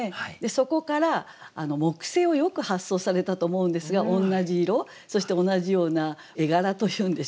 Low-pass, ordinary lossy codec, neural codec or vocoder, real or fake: none; none; none; real